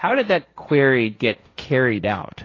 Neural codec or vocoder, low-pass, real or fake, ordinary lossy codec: codec, 16 kHz, 1.1 kbps, Voila-Tokenizer; 7.2 kHz; fake; AAC, 32 kbps